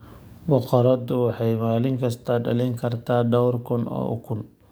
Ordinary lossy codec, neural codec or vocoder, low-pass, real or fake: none; codec, 44.1 kHz, 7.8 kbps, DAC; none; fake